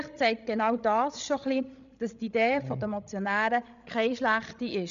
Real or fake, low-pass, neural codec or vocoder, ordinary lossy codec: fake; 7.2 kHz; codec, 16 kHz, 16 kbps, FreqCodec, larger model; none